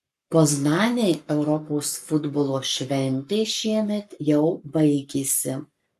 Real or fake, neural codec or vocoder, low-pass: fake; codec, 44.1 kHz, 7.8 kbps, Pupu-Codec; 14.4 kHz